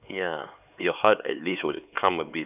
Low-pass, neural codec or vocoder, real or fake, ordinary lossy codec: 3.6 kHz; codec, 16 kHz, 4 kbps, X-Codec, HuBERT features, trained on LibriSpeech; fake; none